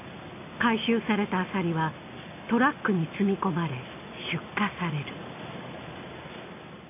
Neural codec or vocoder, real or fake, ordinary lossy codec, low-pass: none; real; none; 3.6 kHz